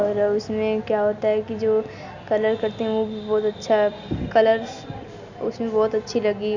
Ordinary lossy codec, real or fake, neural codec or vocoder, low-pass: Opus, 64 kbps; real; none; 7.2 kHz